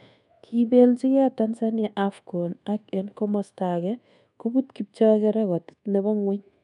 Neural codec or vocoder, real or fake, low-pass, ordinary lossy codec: codec, 24 kHz, 1.2 kbps, DualCodec; fake; 10.8 kHz; none